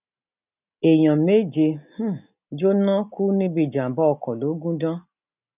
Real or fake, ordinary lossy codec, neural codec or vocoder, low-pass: real; none; none; 3.6 kHz